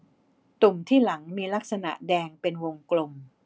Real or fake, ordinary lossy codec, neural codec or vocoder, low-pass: real; none; none; none